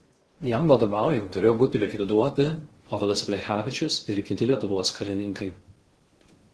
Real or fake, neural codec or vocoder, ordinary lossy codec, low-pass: fake; codec, 16 kHz in and 24 kHz out, 0.6 kbps, FocalCodec, streaming, 2048 codes; Opus, 16 kbps; 10.8 kHz